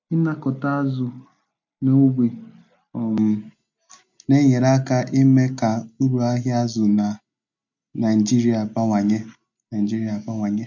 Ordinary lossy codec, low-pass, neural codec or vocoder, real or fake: MP3, 48 kbps; 7.2 kHz; none; real